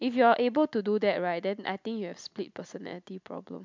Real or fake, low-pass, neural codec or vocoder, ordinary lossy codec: real; 7.2 kHz; none; MP3, 64 kbps